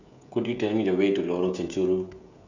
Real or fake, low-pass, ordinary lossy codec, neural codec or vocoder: fake; 7.2 kHz; none; codec, 16 kHz, 16 kbps, FreqCodec, smaller model